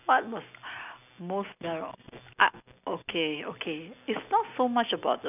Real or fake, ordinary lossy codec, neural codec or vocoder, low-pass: real; none; none; 3.6 kHz